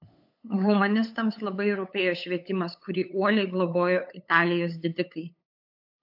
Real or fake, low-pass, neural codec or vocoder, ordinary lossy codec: fake; 5.4 kHz; codec, 16 kHz, 8 kbps, FunCodec, trained on LibriTTS, 25 frames a second; AAC, 48 kbps